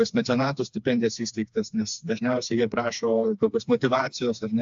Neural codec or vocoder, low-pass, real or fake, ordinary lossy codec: codec, 16 kHz, 2 kbps, FreqCodec, smaller model; 7.2 kHz; fake; AAC, 64 kbps